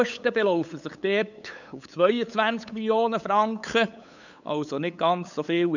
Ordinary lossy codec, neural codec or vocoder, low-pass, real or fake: none; codec, 16 kHz, 8 kbps, FunCodec, trained on LibriTTS, 25 frames a second; 7.2 kHz; fake